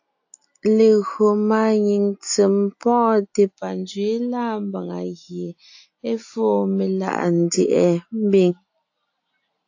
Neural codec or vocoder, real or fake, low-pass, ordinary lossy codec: none; real; 7.2 kHz; AAC, 48 kbps